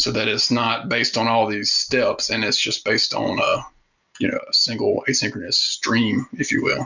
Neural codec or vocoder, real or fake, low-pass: none; real; 7.2 kHz